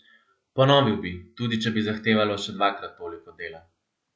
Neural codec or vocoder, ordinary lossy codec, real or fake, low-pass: none; none; real; none